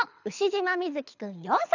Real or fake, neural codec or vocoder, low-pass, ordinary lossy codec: fake; codec, 24 kHz, 6 kbps, HILCodec; 7.2 kHz; none